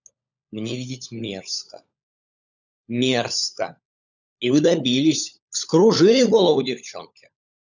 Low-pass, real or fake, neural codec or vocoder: 7.2 kHz; fake; codec, 16 kHz, 16 kbps, FunCodec, trained on LibriTTS, 50 frames a second